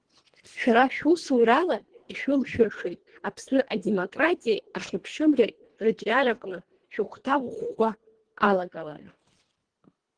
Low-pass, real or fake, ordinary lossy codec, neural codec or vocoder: 9.9 kHz; fake; Opus, 16 kbps; codec, 24 kHz, 1.5 kbps, HILCodec